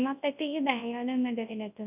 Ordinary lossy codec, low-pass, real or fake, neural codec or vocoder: none; 3.6 kHz; fake; codec, 24 kHz, 0.9 kbps, WavTokenizer, large speech release